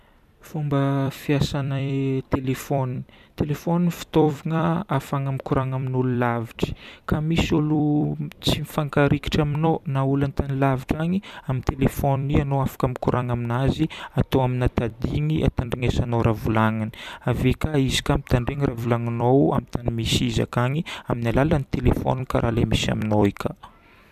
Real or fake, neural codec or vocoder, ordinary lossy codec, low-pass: fake; vocoder, 44.1 kHz, 128 mel bands every 256 samples, BigVGAN v2; none; 14.4 kHz